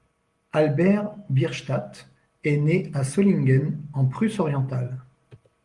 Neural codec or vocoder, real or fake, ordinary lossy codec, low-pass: none; real; Opus, 24 kbps; 10.8 kHz